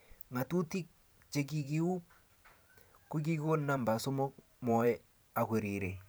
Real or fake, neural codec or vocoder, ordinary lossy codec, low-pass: fake; vocoder, 44.1 kHz, 128 mel bands every 512 samples, BigVGAN v2; none; none